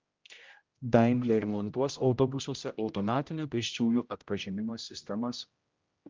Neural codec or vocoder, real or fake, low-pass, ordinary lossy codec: codec, 16 kHz, 0.5 kbps, X-Codec, HuBERT features, trained on general audio; fake; 7.2 kHz; Opus, 24 kbps